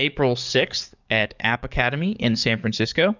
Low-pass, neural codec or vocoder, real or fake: 7.2 kHz; codec, 44.1 kHz, 7.8 kbps, DAC; fake